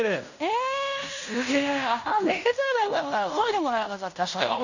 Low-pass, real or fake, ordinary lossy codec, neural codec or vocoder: 7.2 kHz; fake; none; codec, 16 kHz in and 24 kHz out, 0.9 kbps, LongCat-Audio-Codec, fine tuned four codebook decoder